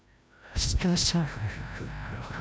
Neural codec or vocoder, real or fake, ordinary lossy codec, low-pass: codec, 16 kHz, 0.5 kbps, FreqCodec, larger model; fake; none; none